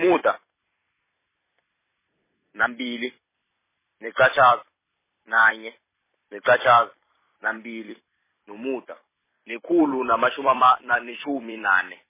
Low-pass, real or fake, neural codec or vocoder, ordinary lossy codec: 3.6 kHz; fake; vocoder, 44.1 kHz, 128 mel bands every 256 samples, BigVGAN v2; MP3, 16 kbps